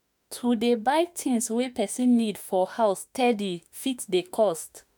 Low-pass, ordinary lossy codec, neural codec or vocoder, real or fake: none; none; autoencoder, 48 kHz, 32 numbers a frame, DAC-VAE, trained on Japanese speech; fake